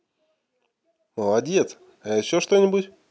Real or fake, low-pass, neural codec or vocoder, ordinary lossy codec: real; none; none; none